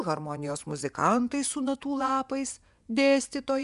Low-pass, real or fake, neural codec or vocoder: 10.8 kHz; fake; vocoder, 24 kHz, 100 mel bands, Vocos